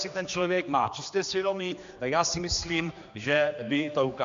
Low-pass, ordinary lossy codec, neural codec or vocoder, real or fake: 7.2 kHz; AAC, 64 kbps; codec, 16 kHz, 2 kbps, X-Codec, HuBERT features, trained on general audio; fake